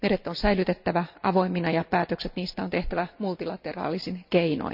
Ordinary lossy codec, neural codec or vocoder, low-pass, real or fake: none; none; 5.4 kHz; real